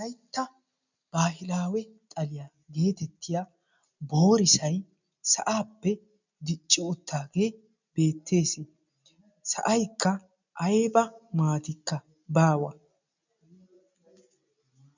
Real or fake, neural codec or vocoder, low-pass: real; none; 7.2 kHz